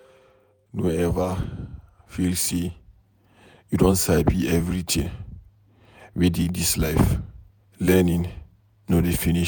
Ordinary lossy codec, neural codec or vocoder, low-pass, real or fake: none; vocoder, 48 kHz, 128 mel bands, Vocos; none; fake